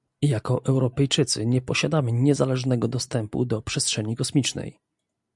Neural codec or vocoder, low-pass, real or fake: none; 10.8 kHz; real